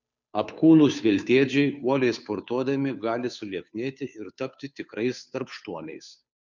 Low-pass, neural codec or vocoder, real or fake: 7.2 kHz; codec, 16 kHz, 2 kbps, FunCodec, trained on Chinese and English, 25 frames a second; fake